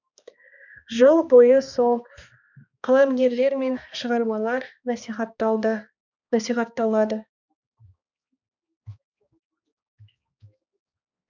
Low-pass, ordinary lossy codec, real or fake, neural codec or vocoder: 7.2 kHz; none; fake; codec, 16 kHz, 2 kbps, X-Codec, HuBERT features, trained on balanced general audio